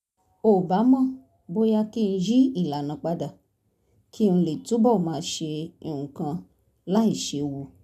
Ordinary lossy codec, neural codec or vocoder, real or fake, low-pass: none; none; real; 14.4 kHz